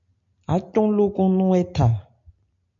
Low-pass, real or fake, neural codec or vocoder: 7.2 kHz; real; none